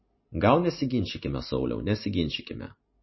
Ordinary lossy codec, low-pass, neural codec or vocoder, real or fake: MP3, 24 kbps; 7.2 kHz; none; real